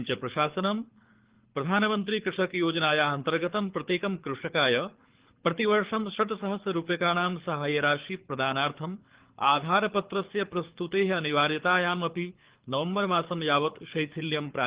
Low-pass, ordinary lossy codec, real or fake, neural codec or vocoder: 3.6 kHz; Opus, 16 kbps; fake; codec, 24 kHz, 6 kbps, HILCodec